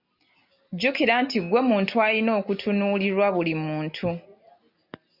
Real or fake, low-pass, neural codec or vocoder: real; 5.4 kHz; none